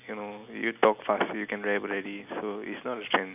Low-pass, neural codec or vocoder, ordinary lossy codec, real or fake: 3.6 kHz; none; none; real